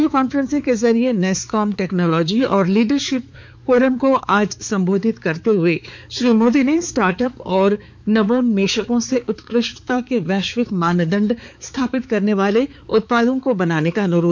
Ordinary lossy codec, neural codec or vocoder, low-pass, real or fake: none; codec, 16 kHz, 4 kbps, X-Codec, HuBERT features, trained on balanced general audio; none; fake